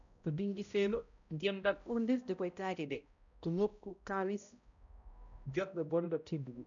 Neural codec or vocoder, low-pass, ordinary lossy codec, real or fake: codec, 16 kHz, 0.5 kbps, X-Codec, HuBERT features, trained on balanced general audio; 7.2 kHz; none; fake